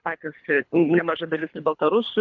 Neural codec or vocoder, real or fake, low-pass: codec, 24 kHz, 3 kbps, HILCodec; fake; 7.2 kHz